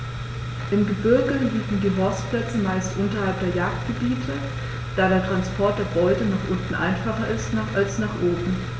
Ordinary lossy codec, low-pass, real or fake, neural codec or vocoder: none; none; real; none